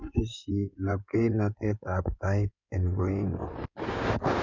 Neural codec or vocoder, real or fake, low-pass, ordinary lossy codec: codec, 16 kHz in and 24 kHz out, 2.2 kbps, FireRedTTS-2 codec; fake; 7.2 kHz; none